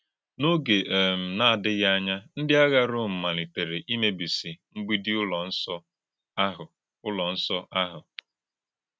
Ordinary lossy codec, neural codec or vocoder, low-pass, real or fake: none; none; none; real